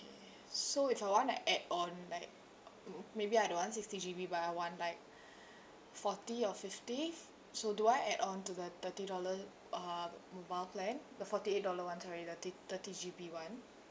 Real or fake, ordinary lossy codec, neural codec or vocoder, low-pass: real; none; none; none